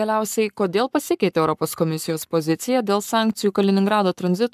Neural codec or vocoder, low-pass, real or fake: codec, 44.1 kHz, 7.8 kbps, Pupu-Codec; 14.4 kHz; fake